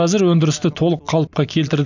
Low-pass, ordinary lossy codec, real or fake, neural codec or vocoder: 7.2 kHz; none; real; none